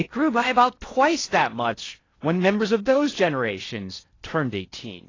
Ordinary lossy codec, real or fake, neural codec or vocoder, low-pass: AAC, 32 kbps; fake; codec, 16 kHz in and 24 kHz out, 0.6 kbps, FocalCodec, streaming, 4096 codes; 7.2 kHz